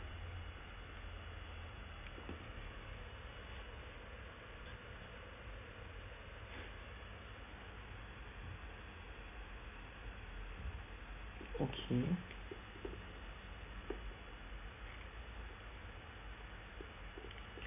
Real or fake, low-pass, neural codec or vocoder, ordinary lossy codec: real; 3.6 kHz; none; none